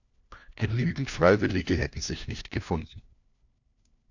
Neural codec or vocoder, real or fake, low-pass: codec, 16 kHz, 1 kbps, FunCodec, trained on LibriTTS, 50 frames a second; fake; 7.2 kHz